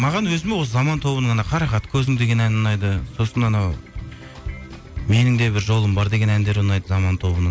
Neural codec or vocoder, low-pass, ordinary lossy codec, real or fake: none; none; none; real